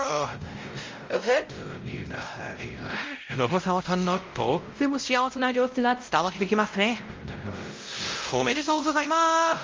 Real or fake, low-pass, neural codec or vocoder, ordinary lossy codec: fake; 7.2 kHz; codec, 16 kHz, 0.5 kbps, X-Codec, WavLM features, trained on Multilingual LibriSpeech; Opus, 32 kbps